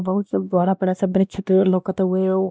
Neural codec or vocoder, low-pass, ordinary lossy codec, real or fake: codec, 16 kHz, 1 kbps, X-Codec, WavLM features, trained on Multilingual LibriSpeech; none; none; fake